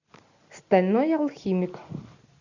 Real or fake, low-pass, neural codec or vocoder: real; 7.2 kHz; none